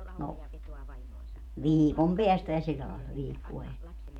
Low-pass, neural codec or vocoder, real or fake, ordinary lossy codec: 19.8 kHz; vocoder, 48 kHz, 128 mel bands, Vocos; fake; none